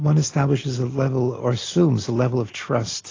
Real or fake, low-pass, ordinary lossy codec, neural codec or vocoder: real; 7.2 kHz; AAC, 32 kbps; none